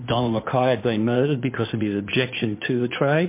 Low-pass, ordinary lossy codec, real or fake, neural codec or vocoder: 3.6 kHz; MP3, 24 kbps; fake; codec, 16 kHz in and 24 kHz out, 2.2 kbps, FireRedTTS-2 codec